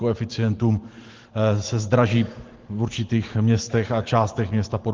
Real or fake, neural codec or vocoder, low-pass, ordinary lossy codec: fake; vocoder, 44.1 kHz, 128 mel bands every 512 samples, BigVGAN v2; 7.2 kHz; Opus, 16 kbps